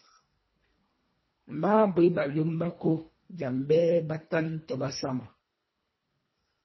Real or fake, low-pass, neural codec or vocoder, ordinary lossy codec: fake; 7.2 kHz; codec, 24 kHz, 1.5 kbps, HILCodec; MP3, 24 kbps